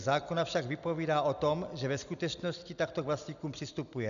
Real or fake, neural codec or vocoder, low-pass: real; none; 7.2 kHz